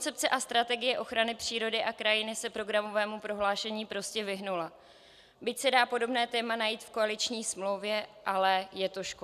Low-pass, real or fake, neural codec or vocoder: 14.4 kHz; real; none